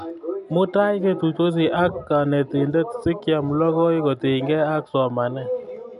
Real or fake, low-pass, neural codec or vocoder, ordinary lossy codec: real; 10.8 kHz; none; none